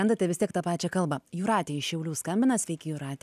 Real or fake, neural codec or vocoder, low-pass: real; none; 14.4 kHz